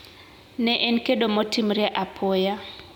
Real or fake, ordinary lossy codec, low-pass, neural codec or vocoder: real; none; 19.8 kHz; none